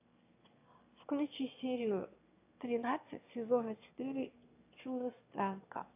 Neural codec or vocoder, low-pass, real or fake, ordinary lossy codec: autoencoder, 22.05 kHz, a latent of 192 numbers a frame, VITS, trained on one speaker; 3.6 kHz; fake; AAC, 32 kbps